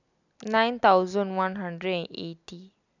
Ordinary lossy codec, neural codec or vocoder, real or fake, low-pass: none; none; real; 7.2 kHz